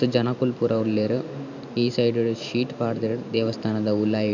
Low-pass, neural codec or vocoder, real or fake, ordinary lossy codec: 7.2 kHz; vocoder, 44.1 kHz, 128 mel bands every 512 samples, BigVGAN v2; fake; none